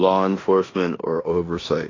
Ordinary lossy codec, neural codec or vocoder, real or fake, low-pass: AAC, 32 kbps; codec, 16 kHz in and 24 kHz out, 0.9 kbps, LongCat-Audio-Codec, four codebook decoder; fake; 7.2 kHz